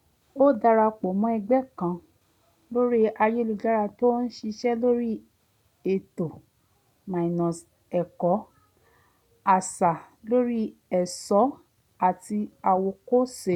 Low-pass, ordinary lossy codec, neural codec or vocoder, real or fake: 19.8 kHz; none; none; real